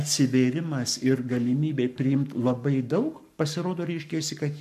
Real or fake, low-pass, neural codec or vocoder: fake; 14.4 kHz; codec, 44.1 kHz, 7.8 kbps, Pupu-Codec